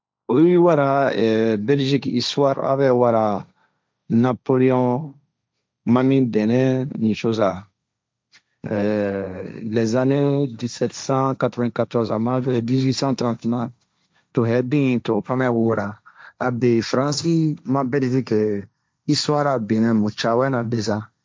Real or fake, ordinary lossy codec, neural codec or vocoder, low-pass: fake; none; codec, 16 kHz, 1.1 kbps, Voila-Tokenizer; none